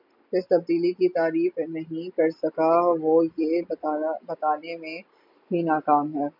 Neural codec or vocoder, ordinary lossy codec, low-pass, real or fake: none; MP3, 48 kbps; 5.4 kHz; real